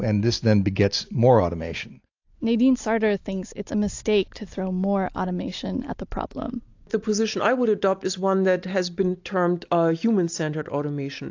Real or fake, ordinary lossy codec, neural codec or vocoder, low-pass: real; AAC, 48 kbps; none; 7.2 kHz